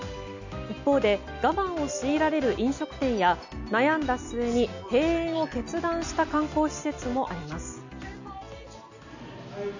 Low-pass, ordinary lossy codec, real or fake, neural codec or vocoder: 7.2 kHz; none; real; none